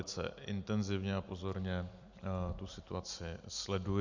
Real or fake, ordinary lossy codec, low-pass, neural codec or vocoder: real; Opus, 64 kbps; 7.2 kHz; none